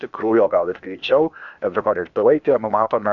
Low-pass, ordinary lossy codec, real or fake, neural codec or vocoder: 7.2 kHz; MP3, 96 kbps; fake; codec, 16 kHz, 0.8 kbps, ZipCodec